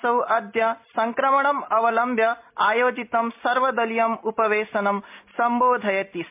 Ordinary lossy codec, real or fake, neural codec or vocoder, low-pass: MP3, 32 kbps; real; none; 3.6 kHz